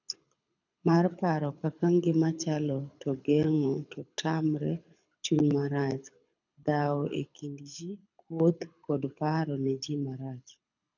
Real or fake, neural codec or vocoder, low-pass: fake; codec, 24 kHz, 6 kbps, HILCodec; 7.2 kHz